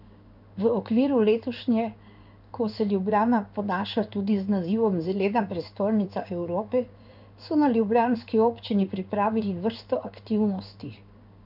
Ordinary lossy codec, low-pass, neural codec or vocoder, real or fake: none; 5.4 kHz; codec, 16 kHz in and 24 kHz out, 1 kbps, XY-Tokenizer; fake